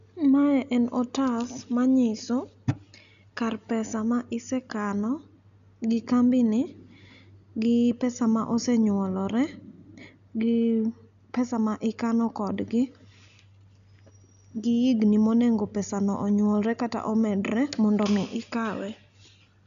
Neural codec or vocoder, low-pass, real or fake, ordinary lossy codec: none; 7.2 kHz; real; none